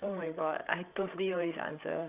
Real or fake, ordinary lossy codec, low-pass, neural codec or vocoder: fake; Opus, 64 kbps; 3.6 kHz; codec, 16 kHz, 8 kbps, FreqCodec, larger model